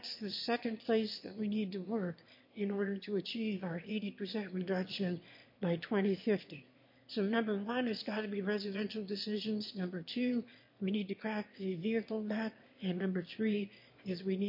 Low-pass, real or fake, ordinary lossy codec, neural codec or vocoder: 5.4 kHz; fake; MP3, 32 kbps; autoencoder, 22.05 kHz, a latent of 192 numbers a frame, VITS, trained on one speaker